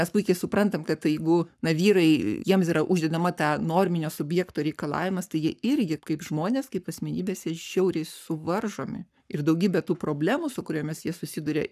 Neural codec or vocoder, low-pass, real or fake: codec, 44.1 kHz, 7.8 kbps, Pupu-Codec; 14.4 kHz; fake